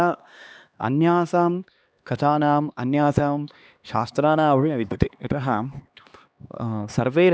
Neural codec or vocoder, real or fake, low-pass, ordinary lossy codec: codec, 16 kHz, 2 kbps, X-Codec, HuBERT features, trained on LibriSpeech; fake; none; none